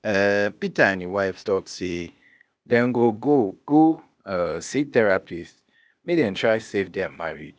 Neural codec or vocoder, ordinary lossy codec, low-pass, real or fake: codec, 16 kHz, 0.8 kbps, ZipCodec; none; none; fake